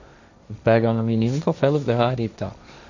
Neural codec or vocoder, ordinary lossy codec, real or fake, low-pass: codec, 16 kHz, 1.1 kbps, Voila-Tokenizer; none; fake; 7.2 kHz